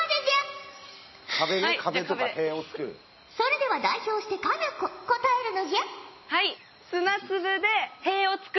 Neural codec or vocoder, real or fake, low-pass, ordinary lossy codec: none; real; 7.2 kHz; MP3, 24 kbps